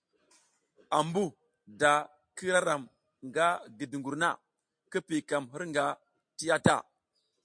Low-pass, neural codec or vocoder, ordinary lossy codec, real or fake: 9.9 kHz; none; MP3, 48 kbps; real